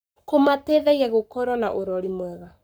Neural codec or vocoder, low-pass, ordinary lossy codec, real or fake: codec, 44.1 kHz, 7.8 kbps, Pupu-Codec; none; none; fake